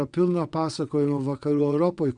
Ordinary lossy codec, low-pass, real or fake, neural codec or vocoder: Opus, 64 kbps; 9.9 kHz; fake; vocoder, 22.05 kHz, 80 mel bands, WaveNeXt